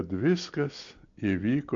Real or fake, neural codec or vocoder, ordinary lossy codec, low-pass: real; none; MP3, 48 kbps; 7.2 kHz